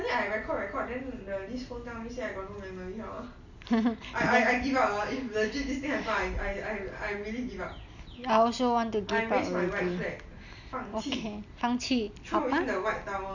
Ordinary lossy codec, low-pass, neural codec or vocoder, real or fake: none; 7.2 kHz; none; real